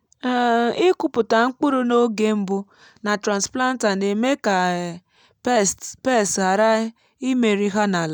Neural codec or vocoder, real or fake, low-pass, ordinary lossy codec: none; real; 19.8 kHz; none